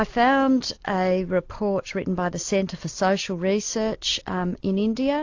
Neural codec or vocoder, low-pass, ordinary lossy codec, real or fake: none; 7.2 kHz; AAC, 48 kbps; real